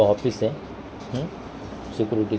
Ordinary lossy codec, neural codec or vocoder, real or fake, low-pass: none; none; real; none